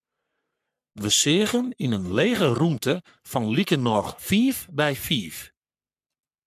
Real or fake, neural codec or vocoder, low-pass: fake; codec, 44.1 kHz, 3.4 kbps, Pupu-Codec; 14.4 kHz